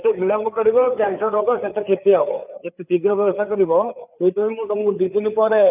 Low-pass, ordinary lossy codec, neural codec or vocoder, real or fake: 3.6 kHz; none; codec, 16 kHz, 4 kbps, FreqCodec, larger model; fake